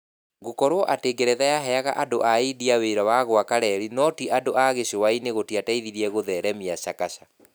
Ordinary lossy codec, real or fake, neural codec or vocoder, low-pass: none; real; none; none